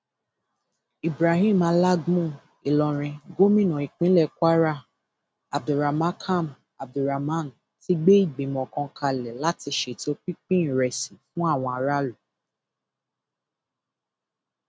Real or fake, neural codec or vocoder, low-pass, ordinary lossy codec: real; none; none; none